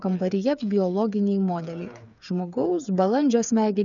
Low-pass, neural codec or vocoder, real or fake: 7.2 kHz; codec, 16 kHz, 8 kbps, FreqCodec, smaller model; fake